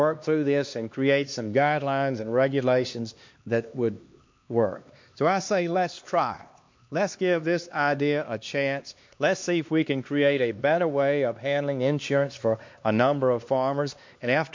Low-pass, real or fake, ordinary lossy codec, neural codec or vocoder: 7.2 kHz; fake; MP3, 48 kbps; codec, 16 kHz, 2 kbps, X-Codec, HuBERT features, trained on LibriSpeech